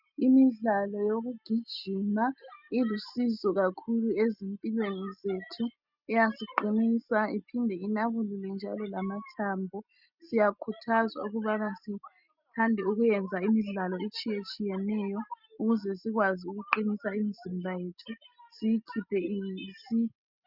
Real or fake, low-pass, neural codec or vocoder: real; 5.4 kHz; none